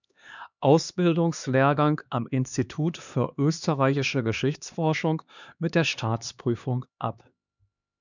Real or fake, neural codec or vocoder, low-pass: fake; codec, 16 kHz, 2 kbps, X-Codec, HuBERT features, trained on LibriSpeech; 7.2 kHz